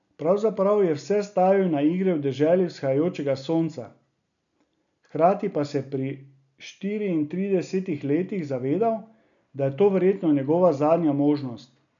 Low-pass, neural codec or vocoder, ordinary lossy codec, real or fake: 7.2 kHz; none; none; real